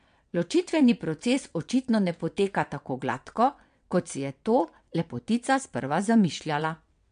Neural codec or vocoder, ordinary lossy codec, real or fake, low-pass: vocoder, 22.05 kHz, 80 mel bands, WaveNeXt; MP3, 64 kbps; fake; 9.9 kHz